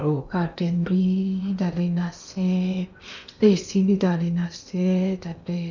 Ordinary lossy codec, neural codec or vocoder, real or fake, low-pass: none; codec, 16 kHz in and 24 kHz out, 0.8 kbps, FocalCodec, streaming, 65536 codes; fake; 7.2 kHz